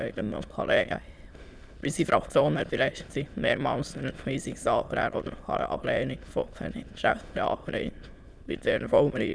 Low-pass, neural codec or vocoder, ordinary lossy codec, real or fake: none; autoencoder, 22.05 kHz, a latent of 192 numbers a frame, VITS, trained on many speakers; none; fake